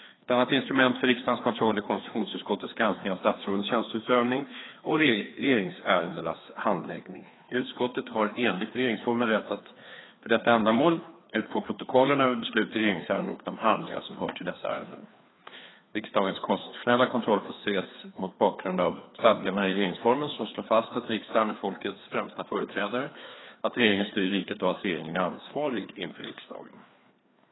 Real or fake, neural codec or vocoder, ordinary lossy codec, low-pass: fake; codec, 16 kHz, 2 kbps, FreqCodec, larger model; AAC, 16 kbps; 7.2 kHz